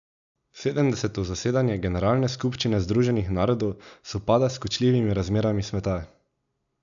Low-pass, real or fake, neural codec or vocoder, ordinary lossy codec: 7.2 kHz; real; none; none